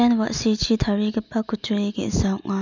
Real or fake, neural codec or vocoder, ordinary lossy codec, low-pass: real; none; none; 7.2 kHz